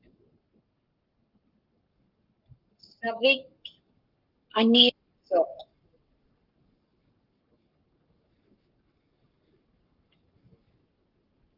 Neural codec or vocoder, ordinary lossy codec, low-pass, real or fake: codec, 44.1 kHz, 7.8 kbps, Pupu-Codec; Opus, 32 kbps; 5.4 kHz; fake